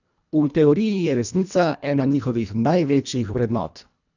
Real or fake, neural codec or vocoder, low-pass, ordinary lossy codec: fake; codec, 24 kHz, 1.5 kbps, HILCodec; 7.2 kHz; none